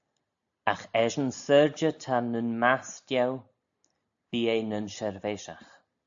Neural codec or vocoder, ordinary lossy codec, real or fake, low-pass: none; AAC, 64 kbps; real; 7.2 kHz